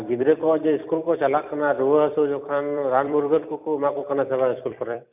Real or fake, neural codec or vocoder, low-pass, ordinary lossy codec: fake; vocoder, 44.1 kHz, 128 mel bands every 256 samples, BigVGAN v2; 3.6 kHz; none